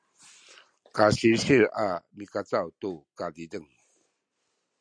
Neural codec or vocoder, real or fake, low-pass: none; real; 9.9 kHz